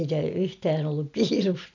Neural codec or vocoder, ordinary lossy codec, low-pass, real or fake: none; none; 7.2 kHz; real